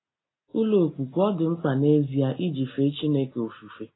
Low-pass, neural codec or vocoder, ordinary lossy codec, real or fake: 7.2 kHz; none; AAC, 16 kbps; real